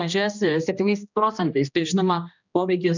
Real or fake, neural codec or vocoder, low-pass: fake; codec, 16 kHz, 1 kbps, X-Codec, HuBERT features, trained on general audio; 7.2 kHz